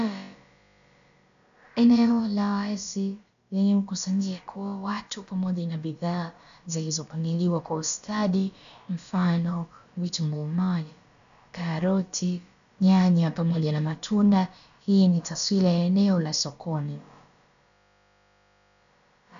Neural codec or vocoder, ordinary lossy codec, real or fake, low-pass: codec, 16 kHz, about 1 kbps, DyCAST, with the encoder's durations; AAC, 96 kbps; fake; 7.2 kHz